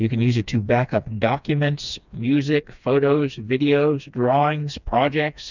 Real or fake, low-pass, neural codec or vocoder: fake; 7.2 kHz; codec, 16 kHz, 2 kbps, FreqCodec, smaller model